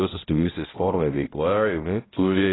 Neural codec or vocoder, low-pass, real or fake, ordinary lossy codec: codec, 16 kHz, 0.5 kbps, X-Codec, HuBERT features, trained on balanced general audio; 7.2 kHz; fake; AAC, 16 kbps